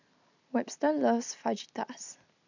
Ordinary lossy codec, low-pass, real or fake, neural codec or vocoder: none; 7.2 kHz; real; none